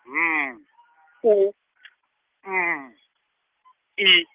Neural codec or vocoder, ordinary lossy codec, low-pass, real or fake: none; Opus, 16 kbps; 3.6 kHz; real